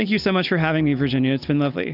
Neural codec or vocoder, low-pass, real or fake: none; 5.4 kHz; real